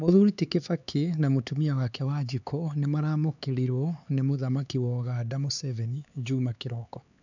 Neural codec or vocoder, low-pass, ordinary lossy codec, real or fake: codec, 16 kHz, 4 kbps, X-Codec, HuBERT features, trained on LibriSpeech; 7.2 kHz; none; fake